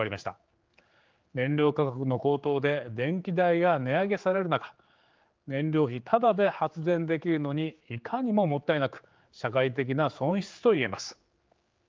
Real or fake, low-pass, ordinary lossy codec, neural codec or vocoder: fake; 7.2 kHz; Opus, 32 kbps; codec, 16 kHz, 4 kbps, X-Codec, HuBERT features, trained on general audio